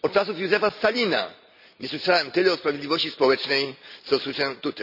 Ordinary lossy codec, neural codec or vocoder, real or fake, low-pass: none; none; real; 5.4 kHz